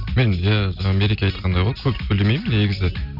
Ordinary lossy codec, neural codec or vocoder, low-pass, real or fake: none; none; 5.4 kHz; real